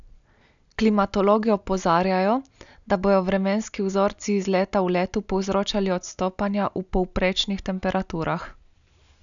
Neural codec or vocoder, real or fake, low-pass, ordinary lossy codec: none; real; 7.2 kHz; MP3, 96 kbps